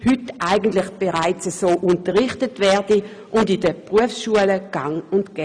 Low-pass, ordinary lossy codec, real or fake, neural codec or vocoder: 9.9 kHz; none; real; none